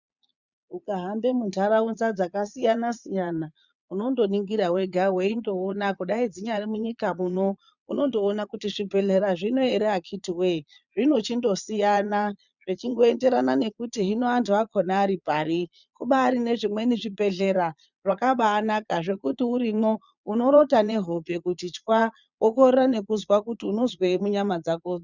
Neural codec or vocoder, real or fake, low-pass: vocoder, 22.05 kHz, 80 mel bands, Vocos; fake; 7.2 kHz